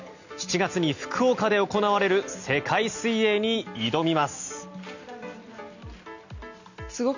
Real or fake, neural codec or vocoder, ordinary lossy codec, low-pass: real; none; none; 7.2 kHz